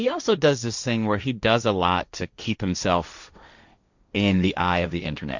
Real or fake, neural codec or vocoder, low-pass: fake; codec, 16 kHz, 1.1 kbps, Voila-Tokenizer; 7.2 kHz